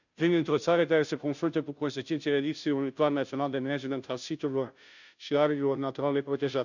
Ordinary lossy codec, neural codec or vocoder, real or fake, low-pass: none; codec, 16 kHz, 0.5 kbps, FunCodec, trained on Chinese and English, 25 frames a second; fake; 7.2 kHz